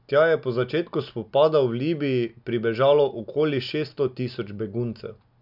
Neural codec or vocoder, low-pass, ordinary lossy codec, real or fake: none; 5.4 kHz; none; real